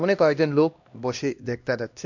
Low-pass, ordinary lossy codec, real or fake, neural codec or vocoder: 7.2 kHz; MP3, 48 kbps; fake; codec, 16 kHz, 1 kbps, X-Codec, HuBERT features, trained on LibriSpeech